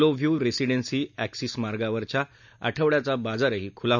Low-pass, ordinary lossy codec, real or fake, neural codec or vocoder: 7.2 kHz; none; real; none